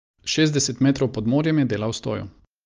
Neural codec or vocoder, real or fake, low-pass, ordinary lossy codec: none; real; 7.2 kHz; Opus, 24 kbps